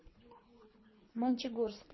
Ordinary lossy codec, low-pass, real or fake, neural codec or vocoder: MP3, 24 kbps; 7.2 kHz; fake; codec, 24 kHz, 3 kbps, HILCodec